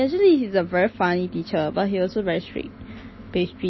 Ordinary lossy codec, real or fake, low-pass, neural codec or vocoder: MP3, 24 kbps; real; 7.2 kHz; none